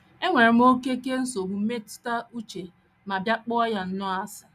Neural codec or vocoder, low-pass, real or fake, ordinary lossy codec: none; 14.4 kHz; real; none